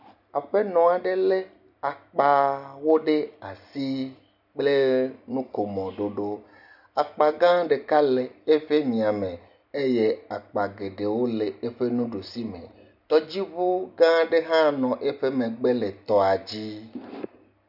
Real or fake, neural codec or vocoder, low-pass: real; none; 5.4 kHz